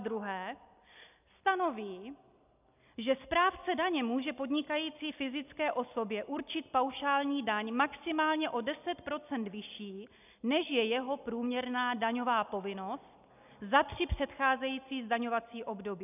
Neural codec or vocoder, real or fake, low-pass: none; real; 3.6 kHz